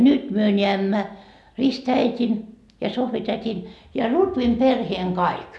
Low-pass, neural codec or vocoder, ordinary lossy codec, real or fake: none; none; none; real